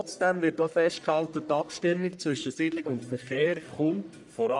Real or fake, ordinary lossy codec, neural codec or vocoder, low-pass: fake; none; codec, 44.1 kHz, 1.7 kbps, Pupu-Codec; 10.8 kHz